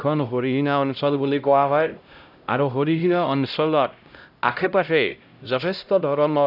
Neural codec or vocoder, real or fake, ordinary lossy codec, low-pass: codec, 16 kHz, 0.5 kbps, X-Codec, HuBERT features, trained on LibriSpeech; fake; none; 5.4 kHz